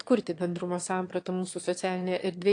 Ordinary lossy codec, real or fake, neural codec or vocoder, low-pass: AAC, 48 kbps; fake; autoencoder, 22.05 kHz, a latent of 192 numbers a frame, VITS, trained on one speaker; 9.9 kHz